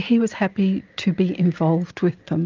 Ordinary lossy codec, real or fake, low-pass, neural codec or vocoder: Opus, 32 kbps; real; 7.2 kHz; none